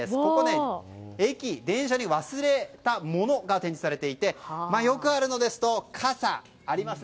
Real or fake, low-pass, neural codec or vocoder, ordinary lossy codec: real; none; none; none